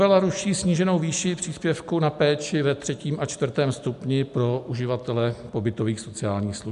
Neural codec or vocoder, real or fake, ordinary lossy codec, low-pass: none; real; MP3, 96 kbps; 10.8 kHz